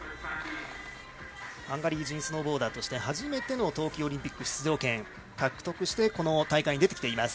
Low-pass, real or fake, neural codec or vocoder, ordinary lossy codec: none; real; none; none